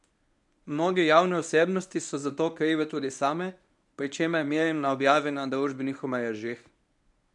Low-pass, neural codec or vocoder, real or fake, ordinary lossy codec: 10.8 kHz; codec, 24 kHz, 0.9 kbps, WavTokenizer, medium speech release version 1; fake; none